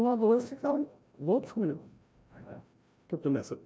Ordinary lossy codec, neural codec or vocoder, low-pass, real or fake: none; codec, 16 kHz, 0.5 kbps, FreqCodec, larger model; none; fake